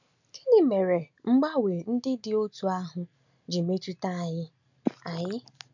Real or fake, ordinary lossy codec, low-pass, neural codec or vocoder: real; none; 7.2 kHz; none